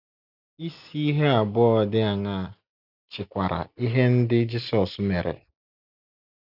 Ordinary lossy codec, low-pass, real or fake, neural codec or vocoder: none; 5.4 kHz; real; none